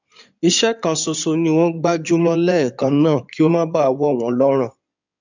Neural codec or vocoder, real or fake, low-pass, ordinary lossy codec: codec, 16 kHz in and 24 kHz out, 2.2 kbps, FireRedTTS-2 codec; fake; 7.2 kHz; none